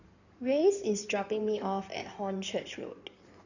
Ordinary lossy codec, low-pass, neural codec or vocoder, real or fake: none; 7.2 kHz; codec, 16 kHz in and 24 kHz out, 2.2 kbps, FireRedTTS-2 codec; fake